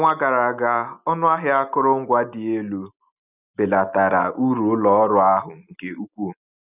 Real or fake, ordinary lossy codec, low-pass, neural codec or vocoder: real; none; 3.6 kHz; none